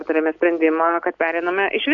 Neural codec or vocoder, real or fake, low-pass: none; real; 7.2 kHz